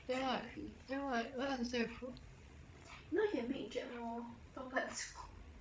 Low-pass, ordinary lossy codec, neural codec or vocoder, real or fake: none; none; codec, 16 kHz, 16 kbps, FreqCodec, larger model; fake